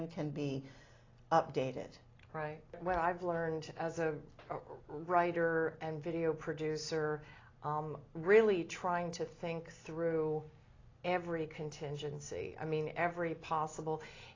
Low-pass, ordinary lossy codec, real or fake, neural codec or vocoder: 7.2 kHz; AAC, 32 kbps; real; none